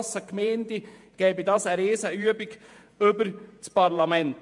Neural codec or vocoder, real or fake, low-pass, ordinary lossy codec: vocoder, 48 kHz, 128 mel bands, Vocos; fake; 10.8 kHz; MP3, 64 kbps